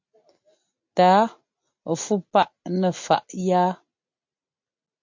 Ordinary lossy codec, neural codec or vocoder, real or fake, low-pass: MP3, 48 kbps; none; real; 7.2 kHz